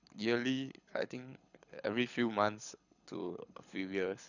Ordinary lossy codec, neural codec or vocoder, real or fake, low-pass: none; codec, 24 kHz, 6 kbps, HILCodec; fake; 7.2 kHz